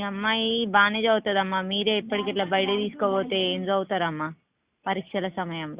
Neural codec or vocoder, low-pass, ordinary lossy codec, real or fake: none; 3.6 kHz; Opus, 64 kbps; real